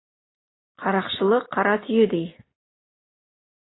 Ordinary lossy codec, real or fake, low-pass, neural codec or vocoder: AAC, 16 kbps; real; 7.2 kHz; none